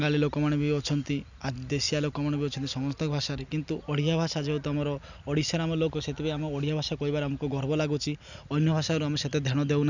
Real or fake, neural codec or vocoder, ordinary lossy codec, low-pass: real; none; none; 7.2 kHz